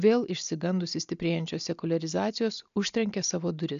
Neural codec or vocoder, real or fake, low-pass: none; real; 7.2 kHz